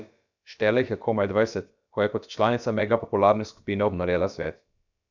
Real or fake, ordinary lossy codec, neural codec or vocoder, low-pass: fake; none; codec, 16 kHz, about 1 kbps, DyCAST, with the encoder's durations; 7.2 kHz